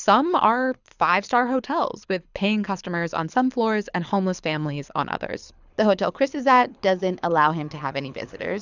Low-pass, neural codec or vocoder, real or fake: 7.2 kHz; codec, 24 kHz, 6 kbps, HILCodec; fake